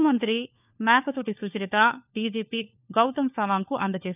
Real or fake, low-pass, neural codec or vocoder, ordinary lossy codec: fake; 3.6 kHz; codec, 16 kHz, 4 kbps, FunCodec, trained on LibriTTS, 50 frames a second; none